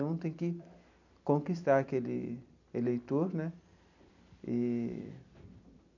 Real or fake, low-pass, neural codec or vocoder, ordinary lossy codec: fake; 7.2 kHz; vocoder, 44.1 kHz, 128 mel bands every 256 samples, BigVGAN v2; none